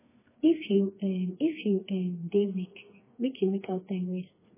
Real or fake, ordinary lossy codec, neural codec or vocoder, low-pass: fake; MP3, 16 kbps; codec, 16 kHz, 4 kbps, FreqCodec, smaller model; 3.6 kHz